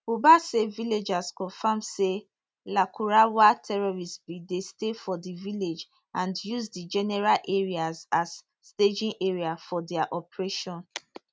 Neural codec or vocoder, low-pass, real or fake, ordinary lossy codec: none; none; real; none